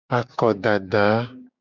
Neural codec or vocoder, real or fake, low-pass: codec, 16 kHz, 6 kbps, DAC; fake; 7.2 kHz